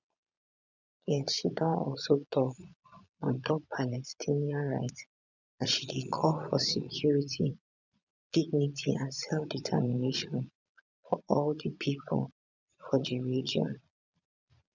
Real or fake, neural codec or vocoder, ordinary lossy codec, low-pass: fake; vocoder, 22.05 kHz, 80 mel bands, Vocos; none; 7.2 kHz